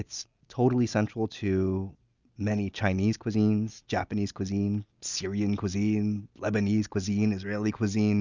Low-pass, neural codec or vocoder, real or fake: 7.2 kHz; none; real